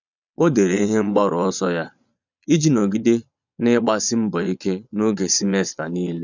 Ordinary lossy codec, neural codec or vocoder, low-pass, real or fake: none; vocoder, 22.05 kHz, 80 mel bands, Vocos; 7.2 kHz; fake